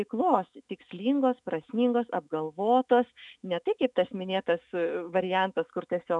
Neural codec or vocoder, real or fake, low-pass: autoencoder, 48 kHz, 128 numbers a frame, DAC-VAE, trained on Japanese speech; fake; 10.8 kHz